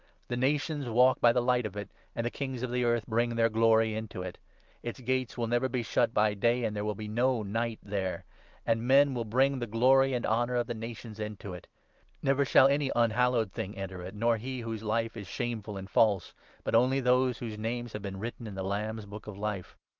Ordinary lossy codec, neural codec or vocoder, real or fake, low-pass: Opus, 24 kbps; none; real; 7.2 kHz